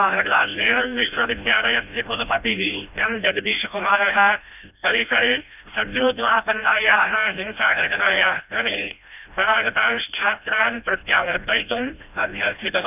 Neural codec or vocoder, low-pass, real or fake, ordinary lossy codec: codec, 16 kHz, 1 kbps, FreqCodec, smaller model; 3.6 kHz; fake; none